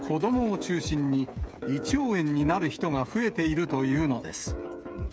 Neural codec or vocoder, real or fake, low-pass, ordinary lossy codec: codec, 16 kHz, 8 kbps, FreqCodec, smaller model; fake; none; none